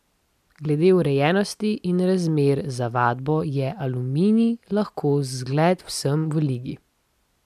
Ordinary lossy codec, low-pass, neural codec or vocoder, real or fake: MP3, 96 kbps; 14.4 kHz; none; real